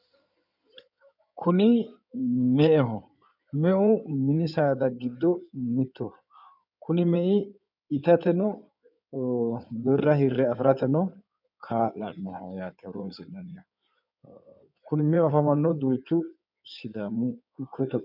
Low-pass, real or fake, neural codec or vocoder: 5.4 kHz; fake; codec, 16 kHz in and 24 kHz out, 2.2 kbps, FireRedTTS-2 codec